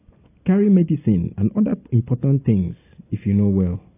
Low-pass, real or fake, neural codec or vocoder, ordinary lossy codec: 3.6 kHz; real; none; AAC, 16 kbps